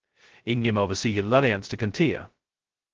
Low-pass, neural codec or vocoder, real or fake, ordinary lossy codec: 7.2 kHz; codec, 16 kHz, 0.2 kbps, FocalCodec; fake; Opus, 16 kbps